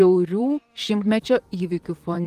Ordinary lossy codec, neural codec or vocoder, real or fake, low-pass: Opus, 24 kbps; vocoder, 44.1 kHz, 128 mel bands, Pupu-Vocoder; fake; 14.4 kHz